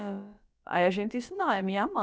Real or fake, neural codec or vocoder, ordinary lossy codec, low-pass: fake; codec, 16 kHz, about 1 kbps, DyCAST, with the encoder's durations; none; none